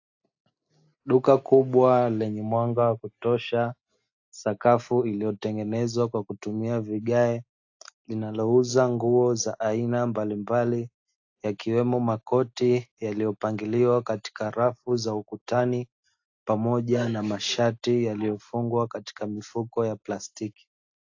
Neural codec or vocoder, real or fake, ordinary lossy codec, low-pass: none; real; AAC, 48 kbps; 7.2 kHz